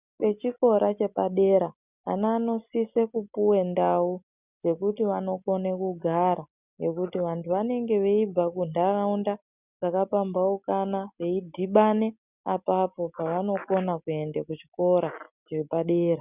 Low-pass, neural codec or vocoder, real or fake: 3.6 kHz; none; real